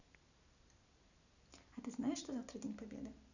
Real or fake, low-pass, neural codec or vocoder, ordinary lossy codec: real; 7.2 kHz; none; none